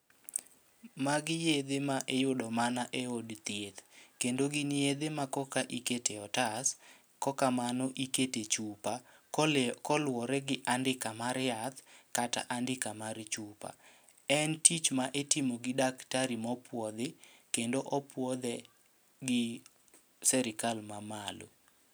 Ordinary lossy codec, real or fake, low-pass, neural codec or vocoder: none; real; none; none